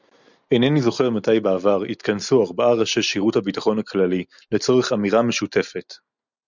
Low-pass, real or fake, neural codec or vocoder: 7.2 kHz; real; none